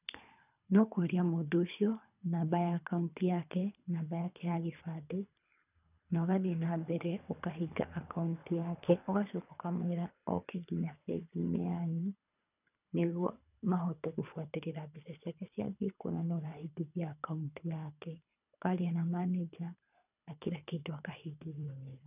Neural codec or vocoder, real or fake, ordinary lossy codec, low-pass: codec, 24 kHz, 3 kbps, HILCodec; fake; none; 3.6 kHz